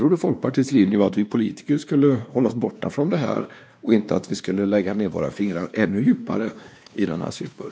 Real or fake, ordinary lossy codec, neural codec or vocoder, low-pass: fake; none; codec, 16 kHz, 2 kbps, X-Codec, WavLM features, trained on Multilingual LibriSpeech; none